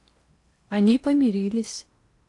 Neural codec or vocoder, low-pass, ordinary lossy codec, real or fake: codec, 16 kHz in and 24 kHz out, 0.8 kbps, FocalCodec, streaming, 65536 codes; 10.8 kHz; AAC, 48 kbps; fake